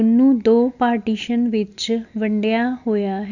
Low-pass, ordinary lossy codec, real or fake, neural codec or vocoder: 7.2 kHz; none; real; none